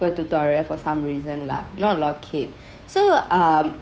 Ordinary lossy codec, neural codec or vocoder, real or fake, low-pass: none; codec, 16 kHz, 2 kbps, FunCodec, trained on Chinese and English, 25 frames a second; fake; none